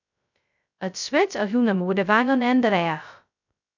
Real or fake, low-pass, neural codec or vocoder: fake; 7.2 kHz; codec, 16 kHz, 0.2 kbps, FocalCodec